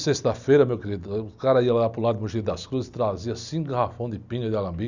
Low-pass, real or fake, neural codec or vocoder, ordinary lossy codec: 7.2 kHz; real; none; none